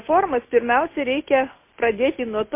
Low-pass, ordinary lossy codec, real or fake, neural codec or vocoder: 3.6 kHz; MP3, 24 kbps; real; none